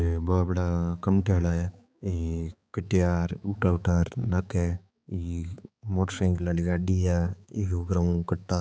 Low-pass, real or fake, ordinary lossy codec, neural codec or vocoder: none; fake; none; codec, 16 kHz, 4 kbps, X-Codec, HuBERT features, trained on balanced general audio